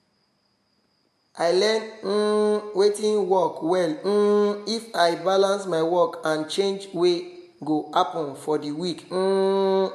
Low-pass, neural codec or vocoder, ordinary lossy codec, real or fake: 14.4 kHz; none; MP3, 64 kbps; real